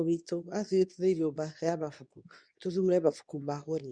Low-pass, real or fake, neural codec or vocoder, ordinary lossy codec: 9.9 kHz; fake; codec, 24 kHz, 0.9 kbps, WavTokenizer, medium speech release version 2; none